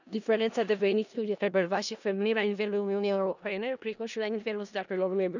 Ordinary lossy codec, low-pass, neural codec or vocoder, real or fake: none; 7.2 kHz; codec, 16 kHz in and 24 kHz out, 0.4 kbps, LongCat-Audio-Codec, four codebook decoder; fake